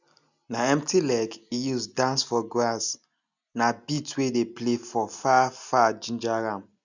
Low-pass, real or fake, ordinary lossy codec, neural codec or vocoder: 7.2 kHz; real; none; none